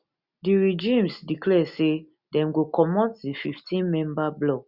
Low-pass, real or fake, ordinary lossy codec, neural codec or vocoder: 5.4 kHz; real; Opus, 64 kbps; none